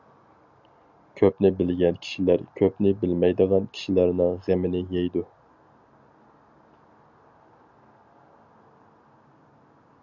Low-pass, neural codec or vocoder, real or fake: 7.2 kHz; none; real